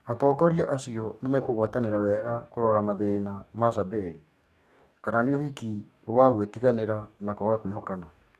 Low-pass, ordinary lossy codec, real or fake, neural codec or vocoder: 14.4 kHz; none; fake; codec, 44.1 kHz, 2.6 kbps, DAC